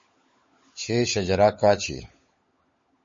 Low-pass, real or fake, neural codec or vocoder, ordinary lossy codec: 7.2 kHz; fake; codec, 16 kHz, 16 kbps, FunCodec, trained on LibriTTS, 50 frames a second; MP3, 32 kbps